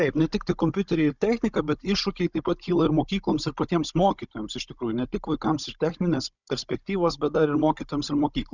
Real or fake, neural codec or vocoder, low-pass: fake; codec, 16 kHz, 16 kbps, FunCodec, trained on Chinese and English, 50 frames a second; 7.2 kHz